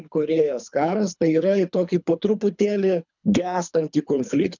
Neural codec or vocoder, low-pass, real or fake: codec, 24 kHz, 3 kbps, HILCodec; 7.2 kHz; fake